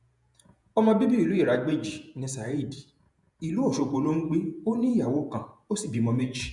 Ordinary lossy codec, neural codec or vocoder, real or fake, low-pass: none; vocoder, 48 kHz, 128 mel bands, Vocos; fake; 10.8 kHz